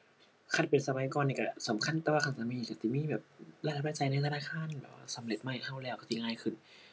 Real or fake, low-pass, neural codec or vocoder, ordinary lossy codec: real; none; none; none